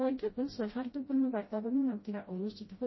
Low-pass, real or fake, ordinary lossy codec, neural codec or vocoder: 7.2 kHz; fake; MP3, 24 kbps; codec, 16 kHz, 0.5 kbps, FreqCodec, smaller model